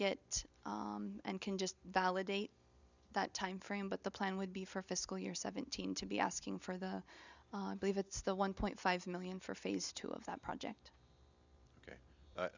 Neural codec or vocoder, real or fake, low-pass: none; real; 7.2 kHz